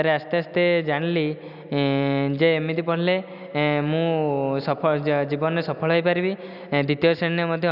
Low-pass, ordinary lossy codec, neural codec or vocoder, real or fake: 5.4 kHz; none; none; real